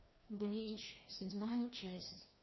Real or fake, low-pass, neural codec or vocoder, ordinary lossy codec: fake; 7.2 kHz; codec, 16 kHz, 1 kbps, FreqCodec, larger model; MP3, 24 kbps